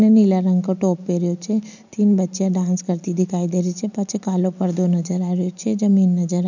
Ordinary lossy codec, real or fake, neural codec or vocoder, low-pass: none; real; none; 7.2 kHz